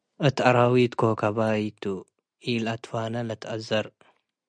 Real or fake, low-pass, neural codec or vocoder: real; 9.9 kHz; none